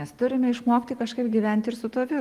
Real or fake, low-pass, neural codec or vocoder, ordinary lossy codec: real; 14.4 kHz; none; Opus, 24 kbps